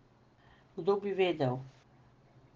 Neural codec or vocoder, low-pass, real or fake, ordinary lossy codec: none; 7.2 kHz; real; Opus, 16 kbps